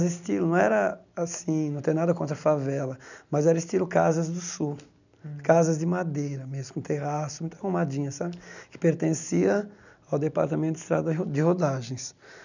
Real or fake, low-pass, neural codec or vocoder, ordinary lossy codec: real; 7.2 kHz; none; none